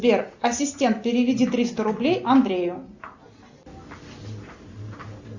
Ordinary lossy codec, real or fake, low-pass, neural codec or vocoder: Opus, 64 kbps; real; 7.2 kHz; none